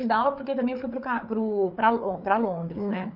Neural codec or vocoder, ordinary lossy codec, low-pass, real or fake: codec, 16 kHz, 4 kbps, FreqCodec, larger model; none; 5.4 kHz; fake